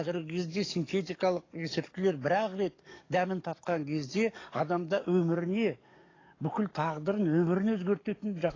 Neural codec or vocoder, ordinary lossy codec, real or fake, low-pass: codec, 44.1 kHz, 7.8 kbps, DAC; AAC, 32 kbps; fake; 7.2 kHz